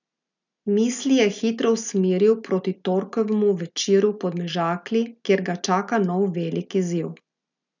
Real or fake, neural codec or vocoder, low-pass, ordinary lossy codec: real; none; 7.2 kHz; none